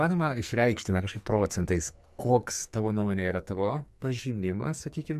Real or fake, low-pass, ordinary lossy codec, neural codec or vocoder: fake; 14.4 kHz; MP3, 96 kbps; codec, 44.1 kHz, 2.6 kbps, SNAC